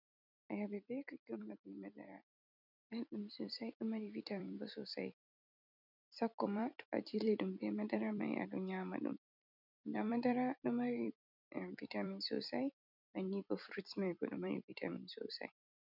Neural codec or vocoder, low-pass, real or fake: vocoder, 44.1 kHz, 128 mel bands every 256 samples, BigVGAN v2; 5.4 kHz; fake